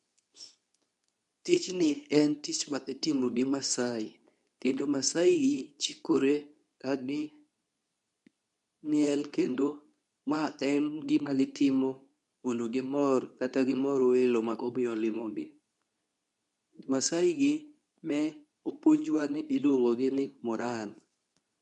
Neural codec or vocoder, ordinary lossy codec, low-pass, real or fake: codec, 24 kHz, 0.9 kbps, WavTokenizer, medium speech release version 2; none; 10.8 kHz; fake